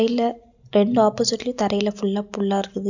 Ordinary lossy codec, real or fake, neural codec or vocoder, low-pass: none; real; none; 7.2 kHz